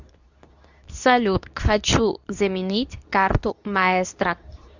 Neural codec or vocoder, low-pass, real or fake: codec, 24 kHz, 0.9 kbps, WavTokenizer, medium speech release version 2; 7.2 kHz; fake